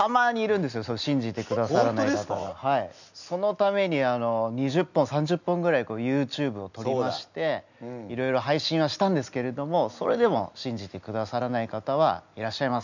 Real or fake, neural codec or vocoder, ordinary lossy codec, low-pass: real; none; none; 7.2 kHz